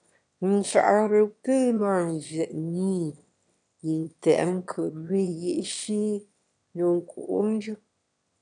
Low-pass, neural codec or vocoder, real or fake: 9.9 kHz; autoencoder, 22.05 kHz, a latent of 192 numbers a frame, VITS, trained on one speaker; fake